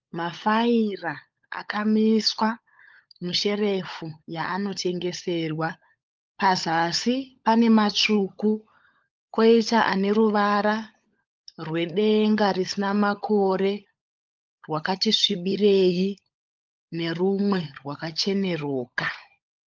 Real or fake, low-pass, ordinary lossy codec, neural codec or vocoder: fake; 7.2 kHz; Opus, 32 kbps; codec, 16 kHz, 16 kbps, FunCodec, trained on LibriTTS, 50 frames a second